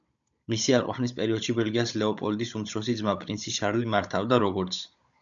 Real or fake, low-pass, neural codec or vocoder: fake; 7.2 kHz; codec, 16 kHz, 16 kbps, FunCodec, trained on Chinese and English, 50 frames a second